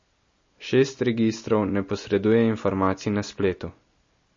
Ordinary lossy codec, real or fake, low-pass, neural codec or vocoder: MP3, 32 kbps; real; 7.2 kHz; none